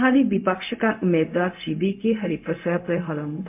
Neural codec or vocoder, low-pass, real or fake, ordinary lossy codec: codec, 16 kHz, 0.4 kbps, LongCat-Audio-Codec; 3.6 kHz; fake; MP3, 32 kbps